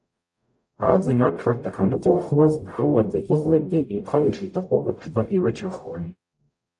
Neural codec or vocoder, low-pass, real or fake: codec, 44.1 kHz, 0.9 kbps, DAC; 10.8 kHz; fake